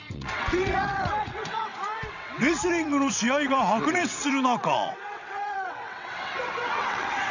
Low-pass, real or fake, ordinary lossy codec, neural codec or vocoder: 7.2 kHz; fake; none; vocoder, 22.05 kHz, 80 mel bands, WaveNeXt